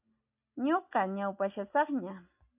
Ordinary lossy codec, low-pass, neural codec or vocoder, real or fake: AAC, 32 kbps; 3.6 kHz; none; real